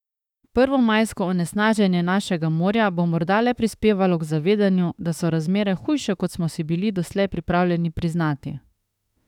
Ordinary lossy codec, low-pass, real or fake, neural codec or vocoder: none; 19.8 kHz; fake; autoencoder, 48 kHz, 32 numbers a frame, DAC-VAE, trained on Japanese speech